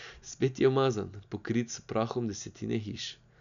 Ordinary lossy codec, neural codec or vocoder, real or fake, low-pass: MP3, 96 kbps; none; real; 7.2 kHz